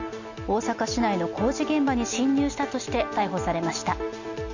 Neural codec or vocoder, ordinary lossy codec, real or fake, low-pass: none; none; real; 7.2 kHz